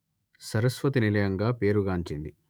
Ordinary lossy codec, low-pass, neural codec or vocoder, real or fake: none; none; autoencoder, 48 kHz, 128 numbers a frame, DAC-VAE, trained on Japanese speech; fake